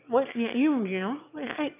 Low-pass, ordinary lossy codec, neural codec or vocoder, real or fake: 3.6 kHz; none; autoencoder, 22.05 kHz, a latent of 192 numbers a frame, VITS, trained on one speaker; fake